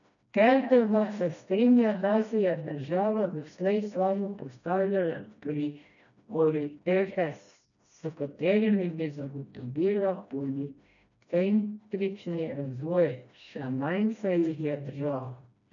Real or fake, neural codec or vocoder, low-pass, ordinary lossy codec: fake; codec, 16 kHz, 1 kbps, FreqCodec, smaller model; 7.2 kHz; none